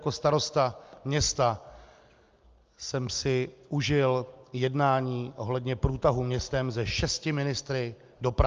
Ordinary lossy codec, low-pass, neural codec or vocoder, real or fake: Opus, 24 kbps; 7.2 kHz; none; real